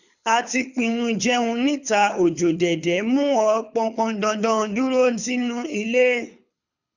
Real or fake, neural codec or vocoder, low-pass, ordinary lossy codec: fake; codec, 24 kHz, 6 kbps, HILCodec; 7.2 kHz; none